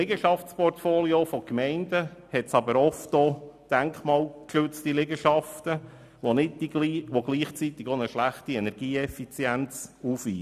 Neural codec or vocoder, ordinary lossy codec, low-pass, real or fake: none; none; 14.4 kHz; real